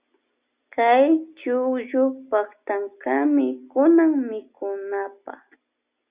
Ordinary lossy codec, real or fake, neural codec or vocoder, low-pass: Opus, 64 kbps; real; none; 3.6 kHz